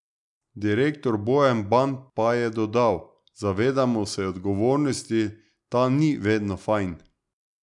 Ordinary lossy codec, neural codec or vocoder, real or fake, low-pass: none; none; real; 10.8 kHz